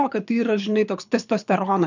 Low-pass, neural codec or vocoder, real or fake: 7.2 kHz; codec, 24 kHz, 6 kbps, HILCodec; fake